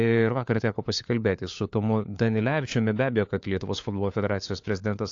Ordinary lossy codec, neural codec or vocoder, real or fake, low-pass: AAC, 48 kbps; codec, 16 kHz, 4 kbps, FunCodec, trained on LibriTTS, 50 frames a second; fake; 7.2 kHz